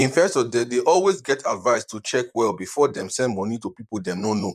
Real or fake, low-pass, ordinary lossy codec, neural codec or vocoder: fake; 14.4 kHz; none; vocoder, 44.1 kHz, 128 mel bands, Pupu-Vocoder